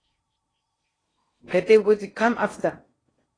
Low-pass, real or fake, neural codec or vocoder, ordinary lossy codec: 9.9 kHz; fake; codec, 16 kHz in and 24 kHz out, 0.6 kbps, FocalCodec, streaming, 4096 codes; AAC, 32 kbps